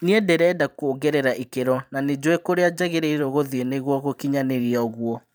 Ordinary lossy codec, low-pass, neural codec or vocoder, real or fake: none; none; vocoder, 44.1 kHz, 128 mel bands every 512 samples, BigVGAN v2; fake